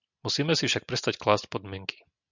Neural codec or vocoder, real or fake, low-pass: none; real; 7.2 kHz